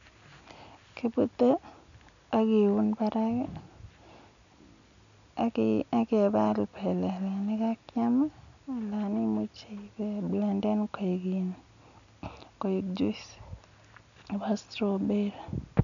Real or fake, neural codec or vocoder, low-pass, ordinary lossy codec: real; none; 7.2 kHz; none